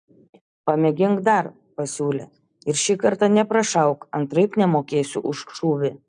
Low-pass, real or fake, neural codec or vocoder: 9.9 kHz; real; none